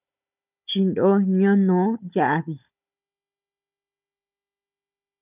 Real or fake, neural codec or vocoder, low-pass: fake; codec, 16 kHz, 16 kbps, FunCodec, trained on Chinese and English, 50 frames a second; 3.6 kHz